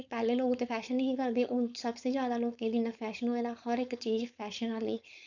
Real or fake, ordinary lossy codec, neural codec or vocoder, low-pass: fake; none; codec, 16 kHz, 4.8 kbps, FACodec; 7.2 kHz